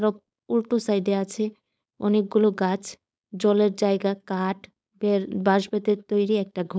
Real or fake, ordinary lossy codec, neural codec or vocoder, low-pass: fake; none; codec, 16 kHz, 4.8 kbps, FACodec; none